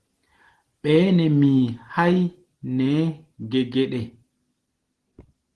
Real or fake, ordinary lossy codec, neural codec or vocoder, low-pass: real; Opus, 16 kbps; none; 10.8 kHz